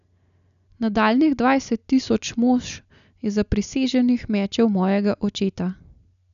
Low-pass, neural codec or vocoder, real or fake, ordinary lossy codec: 7.2 kHz; none; real; none